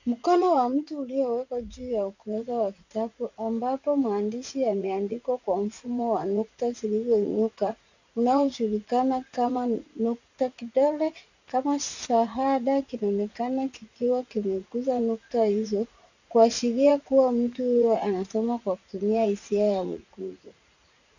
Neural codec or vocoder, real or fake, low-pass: vocoder, 44.1 kHz, 80 mel bands, Vocos; fake; 7.2 kHz